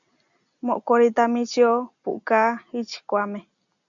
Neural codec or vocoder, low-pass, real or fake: none; 7.2 kHz; real